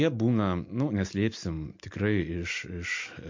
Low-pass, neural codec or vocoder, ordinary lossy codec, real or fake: 7.2 kHz; none; MP3, 48 kbps; real